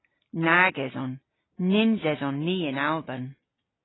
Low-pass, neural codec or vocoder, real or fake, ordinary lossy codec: 7.2 kHz; none; real; AAC, 16 kbps